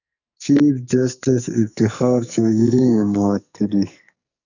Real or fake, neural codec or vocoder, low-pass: fake; codec, 44.1 kHz, 2.6 kbps, SNAC; 7.2 kHz